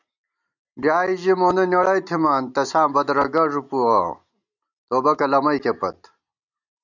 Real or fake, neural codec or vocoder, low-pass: real; none; 7.2 kHz